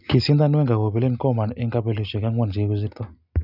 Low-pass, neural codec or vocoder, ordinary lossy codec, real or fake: 5.4 kHz; none; none; real